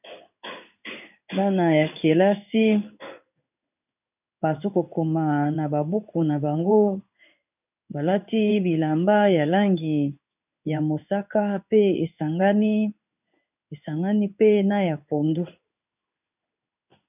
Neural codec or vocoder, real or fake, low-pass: codec, 16 kHz in and 24 kHz out, 1 kbps, XY-Tokenizer; fake; 3.6 kHz